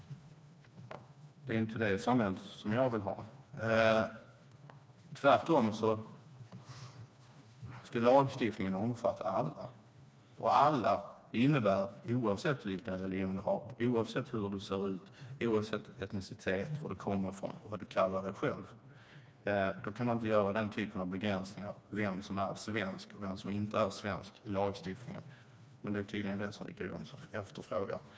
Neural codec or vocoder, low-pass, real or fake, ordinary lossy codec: codec, 16 kHz, 2 kbps, FreqCodec, smaller model; none; fake; none